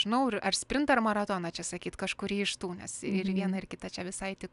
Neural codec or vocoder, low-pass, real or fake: none; 10.8 kHz; real